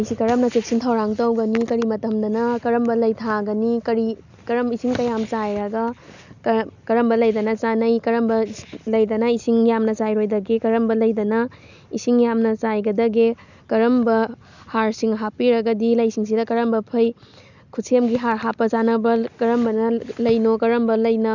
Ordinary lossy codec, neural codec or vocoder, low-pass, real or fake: none; none; 7.2 kHz; real